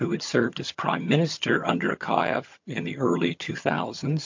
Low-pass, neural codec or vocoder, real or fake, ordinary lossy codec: 7.2 kHz; vocoder, 22.05 kHz, 80 mel bands, HiFi-GAN; fake; MP3, 48 kbps